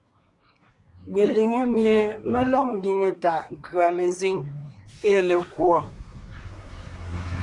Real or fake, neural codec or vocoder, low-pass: fake; codec, 24 kHz, 1 kbps, SNAC; 10.8 kHz